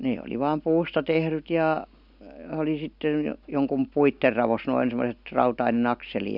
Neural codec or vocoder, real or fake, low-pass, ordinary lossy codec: none; real; 5.4 kHz; none